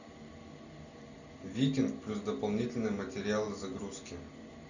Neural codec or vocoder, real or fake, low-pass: none; real; 7.2 kHz